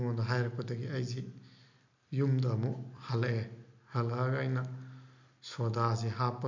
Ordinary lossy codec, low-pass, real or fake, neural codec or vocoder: MP3, 64 kbps; 7.2 kHz; real; none